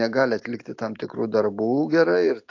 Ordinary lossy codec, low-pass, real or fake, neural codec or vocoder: AAC, 48 kbps; 7.2 kHz; real; none